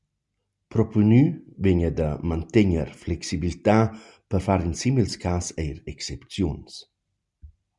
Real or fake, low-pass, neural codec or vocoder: real; 10.8 kHz; none